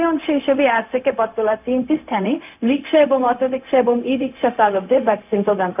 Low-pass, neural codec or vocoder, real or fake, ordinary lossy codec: 3.6 kHz; codec, 16 kHz, 0.4 kbps, LongCat-Audio-Codec; fake; none